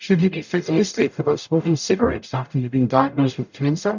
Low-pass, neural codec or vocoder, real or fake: 7.2 kHz; codec, 44.1 kHz, 0.9 kbps, DAC; fake